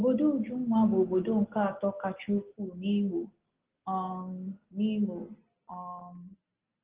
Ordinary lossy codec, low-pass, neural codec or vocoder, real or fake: Opus, 16 kbps; 3.6 kHz; none; real